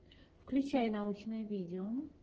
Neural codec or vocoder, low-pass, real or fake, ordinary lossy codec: codec, 44.1 kHz, 2.6 kbps, SNAC; 7.2 kHz; fake; Opus, 16 kbps